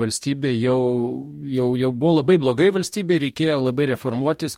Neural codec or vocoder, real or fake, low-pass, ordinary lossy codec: codec, 44.1 kHz, 2.6 kbps, DAC; fake; 19.8 kHz; MP3, 64 kbps